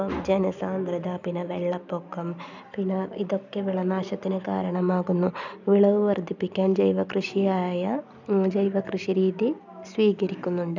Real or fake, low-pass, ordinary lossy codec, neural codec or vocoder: real; 7.2 kHz; none; none